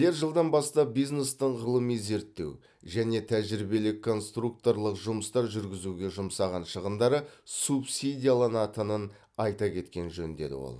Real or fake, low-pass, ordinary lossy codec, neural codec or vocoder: real; none; none; none